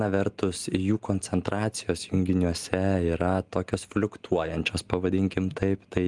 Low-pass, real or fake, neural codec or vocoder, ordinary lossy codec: 10.8 kHz; real; none; Opus, 24 kbps